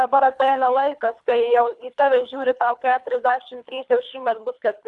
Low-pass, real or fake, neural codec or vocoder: 10.8 kHz; fake; codec, 24 kHz, 3 kbps, HILCodec